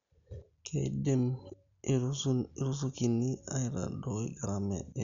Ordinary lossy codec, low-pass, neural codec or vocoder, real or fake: none; 7.2 kHz; none; real